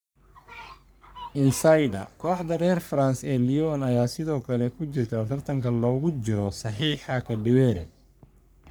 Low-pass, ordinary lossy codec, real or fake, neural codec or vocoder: none; none; fake; codec, 44.1 kHz, 3.4 kbps, Pupu-Codec